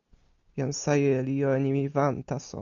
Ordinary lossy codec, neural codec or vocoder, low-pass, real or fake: MP3, 96 kbps; none; 7.2 kHz; real